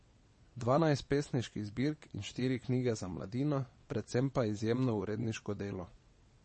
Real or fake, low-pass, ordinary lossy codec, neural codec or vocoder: fake; 9.9 kHz; MP3, 32 kbps; vocoder, 22.05 kHz, 80 mel bands, WaveNeXt